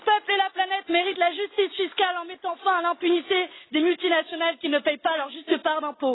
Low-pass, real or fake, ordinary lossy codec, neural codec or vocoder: 7.2 kHz; real; AAC, 16 kbps; none